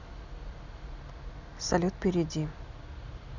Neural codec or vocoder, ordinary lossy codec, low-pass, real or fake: none; none; 7.2 kHz; real